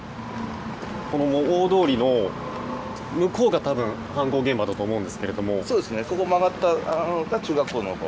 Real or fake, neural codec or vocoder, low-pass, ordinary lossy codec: real; none; none; none